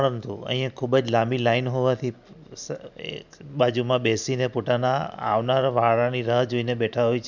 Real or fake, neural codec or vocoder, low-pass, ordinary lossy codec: real; none; 7.2 kHz; none